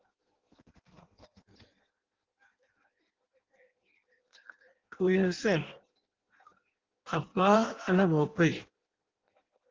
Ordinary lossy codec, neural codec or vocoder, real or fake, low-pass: Opus, 16 kbps; codec, 16 kHz in and 24 kHz out, 0.6 kbps, FireRedTTS-2 codec; fake; 7.2 kHz